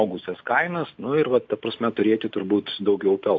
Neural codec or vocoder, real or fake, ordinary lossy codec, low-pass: none; real; AAC, 48 kbps; 7.2 kHz